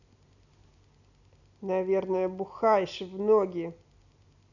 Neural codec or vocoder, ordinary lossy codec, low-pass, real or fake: none; none; 7.2 kHz; real